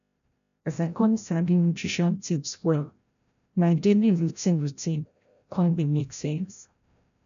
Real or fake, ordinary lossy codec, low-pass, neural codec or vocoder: fake; none; 7.2 kHz; codec, 16 kHz, 0.5 kbps, FreqCodec, larger model